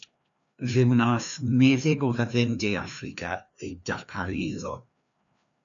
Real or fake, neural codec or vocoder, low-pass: fake; codec, 16 kHz, 2 kbps, FreqCodec, larger model; 7.2 kHz